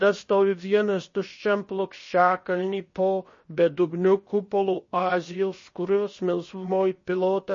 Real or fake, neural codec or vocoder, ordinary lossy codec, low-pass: fake; codec, 16 kHz, about 1 kbps, DyCAST, with the encoder's durations; MP3, 32 kbps; 7.2 kHz